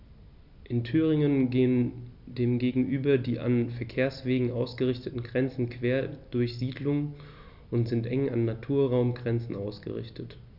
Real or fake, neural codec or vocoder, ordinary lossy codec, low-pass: real; none; none; 5.4 kHz